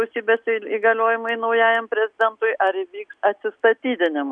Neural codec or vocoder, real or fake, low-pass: none; real; 9.9 kHz